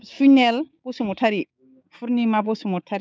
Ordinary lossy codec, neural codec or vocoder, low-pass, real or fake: none; codec, 16 kHz, 6 kbps, DAC; none; fake